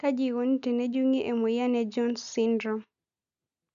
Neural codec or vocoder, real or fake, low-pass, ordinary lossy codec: none; real; 7.2 kHz; none